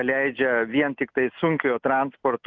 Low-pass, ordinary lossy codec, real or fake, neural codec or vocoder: 7.2 kHz; Opus, 24 kbps; real; none